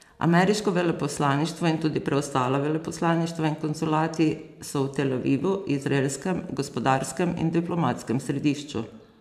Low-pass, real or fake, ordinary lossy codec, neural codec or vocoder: 14.4 kHz; fake; MP3, 96 kbps; vocoder, 44.1 kHz, 128 mel bands every 512 samples, BigVGAN v2